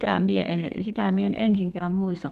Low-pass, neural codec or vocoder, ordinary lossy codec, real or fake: 14.4 kHz; codec, 32 kHz, 1.9 kbps, SNAC; none; fake